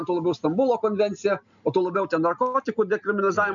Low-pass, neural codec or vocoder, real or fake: 7.2 kHz; none; real